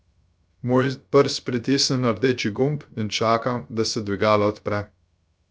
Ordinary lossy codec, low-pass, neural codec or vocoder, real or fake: none; none; codec, 16 kHz, 0.3 kbps, FocalCodec; fake